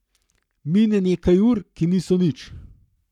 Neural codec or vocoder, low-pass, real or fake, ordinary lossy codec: codec, 44.1 kHz, 7.8 kbps, Pupu-Codec; 19.8 kHz; fake; none